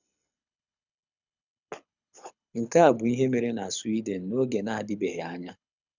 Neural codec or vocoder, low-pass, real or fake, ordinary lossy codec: codec, 24 kHz, 6 kbps, HILCodec; 7.2 kHz; fake; none